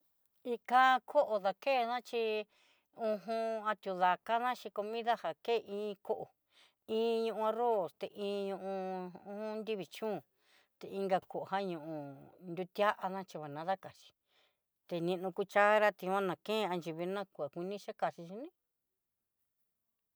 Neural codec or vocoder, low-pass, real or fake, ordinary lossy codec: none; none; real; none